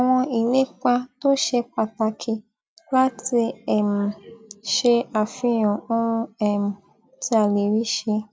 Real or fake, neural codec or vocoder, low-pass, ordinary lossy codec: real; none; none; none